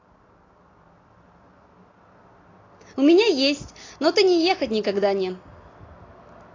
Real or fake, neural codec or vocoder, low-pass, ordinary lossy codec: real; none; 7.2 kHz; AAC, 32 kbps